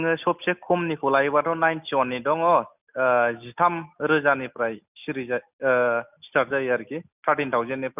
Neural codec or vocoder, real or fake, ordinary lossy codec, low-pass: none; real; none; 3.6 kHz